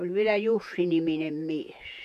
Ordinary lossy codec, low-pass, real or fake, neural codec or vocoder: none; 14.4 kHz; fake; vocoder, 48 kHz, 128 mel bands, Vocos